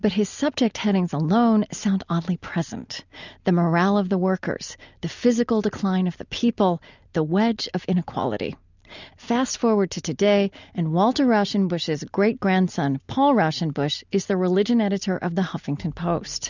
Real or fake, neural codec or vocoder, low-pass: real; none; 7.2 kHz